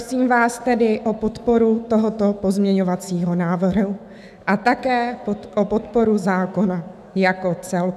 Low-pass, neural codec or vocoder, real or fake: 14.4 kHz; autoencoder, 48 kHz, 128 numbers a frame, DAC-VAE, trained on Japanese speech; fake